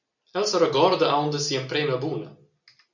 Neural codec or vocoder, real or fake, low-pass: none; real; 7.2 kHz